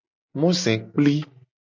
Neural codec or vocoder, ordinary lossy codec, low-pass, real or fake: none; MP3, 64 kbps; 7.2 kHz; real